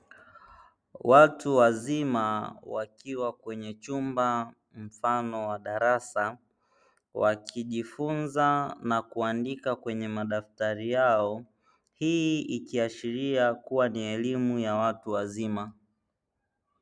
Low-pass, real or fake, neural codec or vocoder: 9.9 kHz; real; none